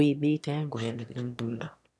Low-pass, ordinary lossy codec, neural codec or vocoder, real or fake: none; none; autoencoder, 22.05 kHz, a latent of 192 numbers a frame, VITS, trained on one speaker; fake